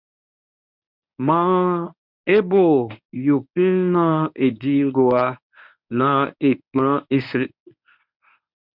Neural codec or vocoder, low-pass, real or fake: codec, 24 kHz, 0.9 kbps, WavTokenizer, medium speech release version 1; 5.4 kHz; fake